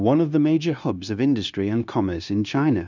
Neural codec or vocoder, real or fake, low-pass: codec, 16 kHz, 0.9 kbps, LongCat-Audio-Codec; fake; 7.2 kHz